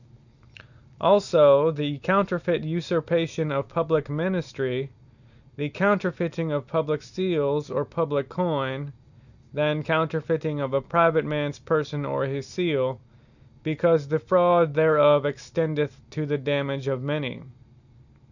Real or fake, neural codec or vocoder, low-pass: real; none; 7.2 kHz